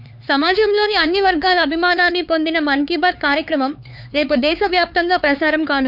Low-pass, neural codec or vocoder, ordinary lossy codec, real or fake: 5.4 kHz; codec, 16 kHz, 4 kbps, X-Codec, HuBERT features, trained on LibriSpeech; none; fake